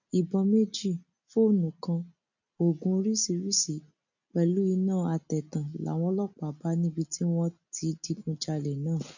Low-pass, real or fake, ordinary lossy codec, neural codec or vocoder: 7.2 kHz; real; none; none